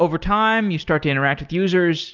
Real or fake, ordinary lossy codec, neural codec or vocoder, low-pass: real; Opus, 32 kbps; none; 7.2 kHz